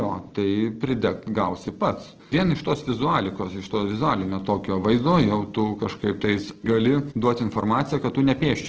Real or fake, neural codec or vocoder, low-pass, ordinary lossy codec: real; none; 7.2 kHz; Opus, 16 kbps